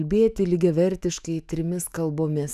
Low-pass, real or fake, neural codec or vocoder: 14.4 kHz; fake; autoencoder, 48 kHz, 128 numbers a frame, DAC-VAE, trained on Japanese speech